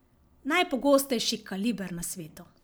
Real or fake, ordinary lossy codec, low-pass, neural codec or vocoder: real; none; none; none